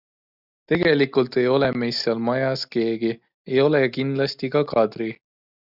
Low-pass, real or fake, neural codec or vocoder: 5.4 kHz; real; none